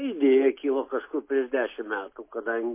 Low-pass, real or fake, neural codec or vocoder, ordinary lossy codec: 3.6 kHz; real; none; MP3, 24 kbps